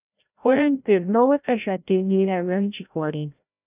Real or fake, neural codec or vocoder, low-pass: fake; codec, 16 kHz, 0.5 kbps, FreqCodec, larger model; 3.6 kHz